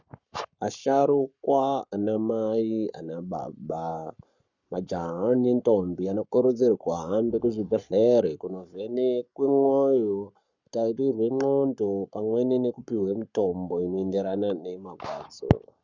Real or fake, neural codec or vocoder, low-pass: fake; codec, 44.1 kHz, 7.8 kbps, Pupu-Codec; 7.2 kHz